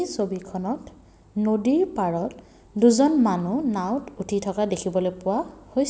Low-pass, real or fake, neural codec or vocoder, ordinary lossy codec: none; real; none; none